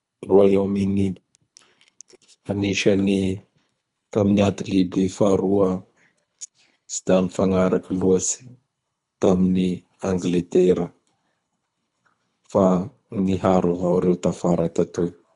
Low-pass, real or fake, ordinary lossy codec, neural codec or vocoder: 10.8 kHz; fake; none; codec, 24 kHz, 3 kbps, HILCodec